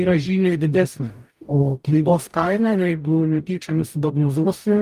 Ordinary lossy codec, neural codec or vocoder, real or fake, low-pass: Opus, 32 kbps; codec, 44.1 kHz, 0.9 kbps, DAC; fake; 14.4 kHz